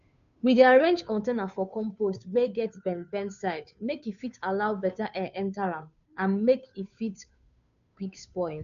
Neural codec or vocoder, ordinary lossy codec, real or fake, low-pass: codec, 16 kHz, 2 kbps, FunCodec, trained on Chinese and English, 25 frames a second; none; fake; 7.2 kHz